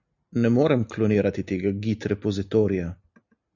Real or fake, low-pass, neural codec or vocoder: real; 7.2 kHz; none